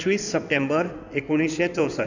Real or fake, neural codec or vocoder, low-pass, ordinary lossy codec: fake; codec, 16 kHz in and 24 kHz out, 2.2 kbps, FireRedTTS-2 codec; 7.2 kHz; none